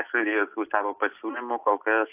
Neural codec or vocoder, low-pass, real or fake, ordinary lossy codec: none; 3.6 kHz; real; AAC, 32 kbps